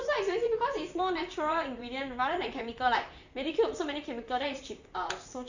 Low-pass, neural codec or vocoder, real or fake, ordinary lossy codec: 7.2 kHz; vocoder, 44.1 kHz, 128 mel bands, Pupu-Vocoder; fake; none